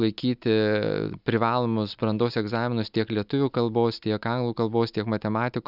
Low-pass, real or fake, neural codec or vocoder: 5.4 kHz; real; none